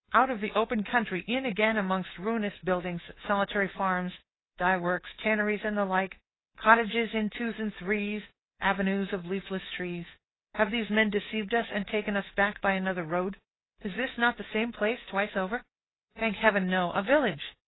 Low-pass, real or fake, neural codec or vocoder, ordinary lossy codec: 7.2 kHz; fake; codec, 24 kHz, 3.1 kbps, DualCodec; AAC, 16 kbps